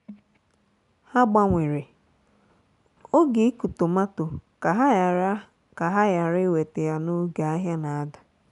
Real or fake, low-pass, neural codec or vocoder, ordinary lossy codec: real; 10.8 kHz; none; none